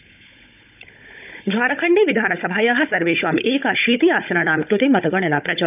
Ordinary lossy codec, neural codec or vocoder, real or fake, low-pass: none; codec, 16 kHz, 16 kbps, FunCodec, trained on Chinese and English, 50 frames a second; fake; 3.6 kHz